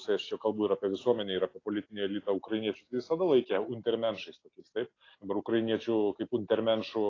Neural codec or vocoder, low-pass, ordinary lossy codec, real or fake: none; 7.2 kHz; AAC, 32 kbps; real